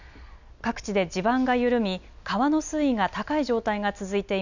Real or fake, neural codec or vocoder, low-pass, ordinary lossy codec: real; none; 7.2 kHz; none